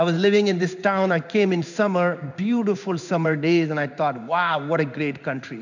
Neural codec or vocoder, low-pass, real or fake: codec, 16 kHz in and 24 kHz out, 1 kbps, XY-Tokenizer; 7.2 kHz; fake